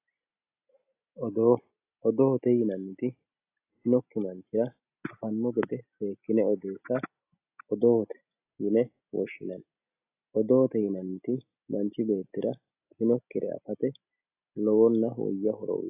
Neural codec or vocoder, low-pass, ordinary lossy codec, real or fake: none; 3.6 kHz; AAC, 32 kbps; real